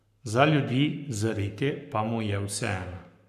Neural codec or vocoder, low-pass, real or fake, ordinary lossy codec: codec, 44.1 kHz, 7.8 kbps, Pupu-Codec; 14.4 kHz; fake; none